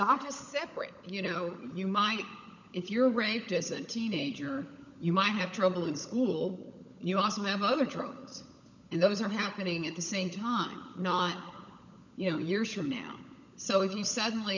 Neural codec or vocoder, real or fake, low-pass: codec, 16 kHz, 16 kbps, FunCodec, trained on LibriTTS, 50 frames a second; fake; 7.2 kHz